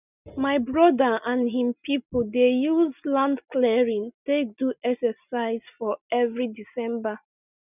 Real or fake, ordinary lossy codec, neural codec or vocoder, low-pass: real; none; none; 3.6 kHz